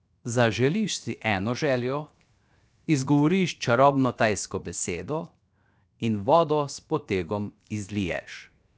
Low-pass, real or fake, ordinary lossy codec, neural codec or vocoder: none; fake; none; codec, 16 kHz, 0.7 kbps, FocalCodec